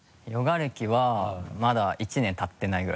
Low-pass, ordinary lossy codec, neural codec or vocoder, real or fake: none; none; none; real